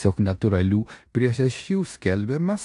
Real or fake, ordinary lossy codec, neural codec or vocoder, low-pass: fake; AAC, 64 kbps; codec, 16 kHz in and 24 kHz out, 0.9 kbps, LongCat-Audio-Codec, four codebook decoder; 10.8 kHz